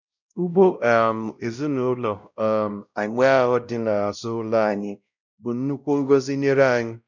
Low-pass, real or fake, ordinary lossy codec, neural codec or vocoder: 7.2 kHz; fake; none; codec, 16 kHz, 0.5 kbps, X-Codec, WavLM features, trained on Multilingual LibriSpeech